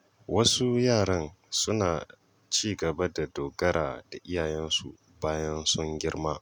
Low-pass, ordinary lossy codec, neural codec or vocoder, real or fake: 19.8 kHz; none; none; real